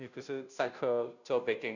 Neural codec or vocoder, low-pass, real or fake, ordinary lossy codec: codec, 16 kHz, 0.5 kbps, FunCodec, trained on Chinese and English, 25 frames a second; 7.2 kHz; fake; none